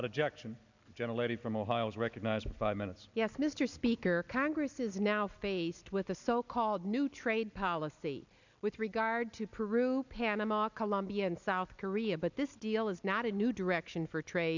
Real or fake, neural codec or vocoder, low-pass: real; none; 7.2 kHz